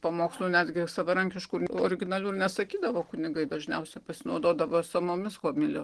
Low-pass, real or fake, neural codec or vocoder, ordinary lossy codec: 10.8 kHz; real; none; Opus, 16 kbps